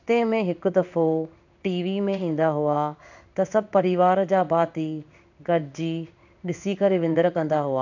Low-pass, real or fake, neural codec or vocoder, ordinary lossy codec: 7.2 kHz; fake; codec, 16 kHz in and 24 kHz out, 1 kbps, XY-Tokenizer; none